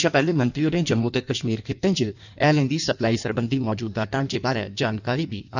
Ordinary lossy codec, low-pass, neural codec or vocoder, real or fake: none; 7.2 kHz; codec, 16 kHz in and 24 kHz out, 1.1 kbps, FireRedTTS-2 codec; fake